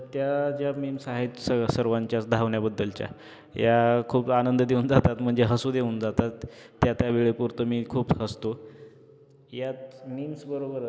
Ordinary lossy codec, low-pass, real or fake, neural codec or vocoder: none; none; real; none